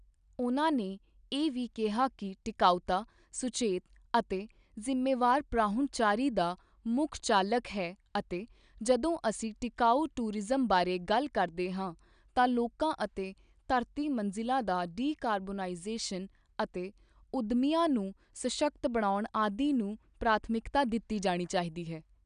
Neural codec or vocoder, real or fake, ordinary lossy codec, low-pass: none; real; none; 9.9 kHz